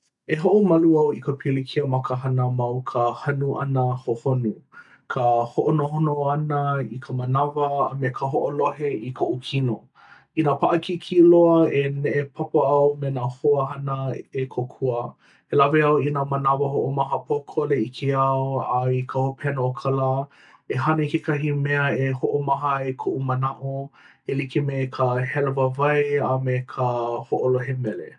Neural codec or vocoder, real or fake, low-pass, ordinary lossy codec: none; real; 10.8 kHz; MP3, 96 kbps